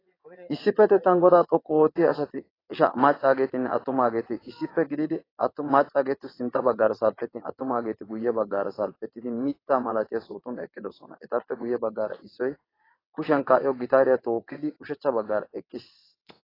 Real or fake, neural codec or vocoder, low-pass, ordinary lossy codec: fake; vocoder, 22.05 kHz, 80 mel bands, WaveNeXt; 5.4 kHz; AAC, 24 kbps